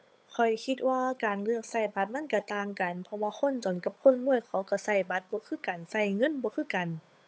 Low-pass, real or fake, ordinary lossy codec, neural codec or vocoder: none; fake; none; codec, 16 kHz, 8 kbps, FunCodec, trained on Chinese and English, 25 frames a second